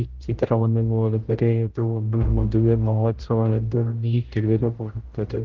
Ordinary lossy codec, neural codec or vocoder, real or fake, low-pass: Opus, 16 kbps; codec, 16 kHz, 0.5 kbps, X-Codec, HuBERT features, trained on general audio; fake; 7.2 kHz